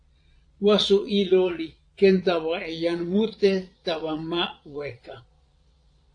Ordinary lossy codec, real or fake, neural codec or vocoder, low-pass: AAC, 48 kbps; fake; vocoder, 22.05 kHz, 80 mel bands, Vocos; 9.9 kHz